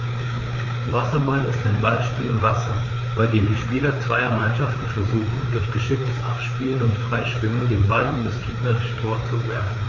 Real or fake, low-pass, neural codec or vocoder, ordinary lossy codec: fake; 7.2 kHz; codec, 16 kHz, 4 kbps, FreqCodec, larger model; none